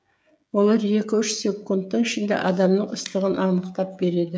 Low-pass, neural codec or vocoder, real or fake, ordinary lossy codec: none; codec, 16 kHz, 16 kbps, FreqCodec, smaller model; fake; none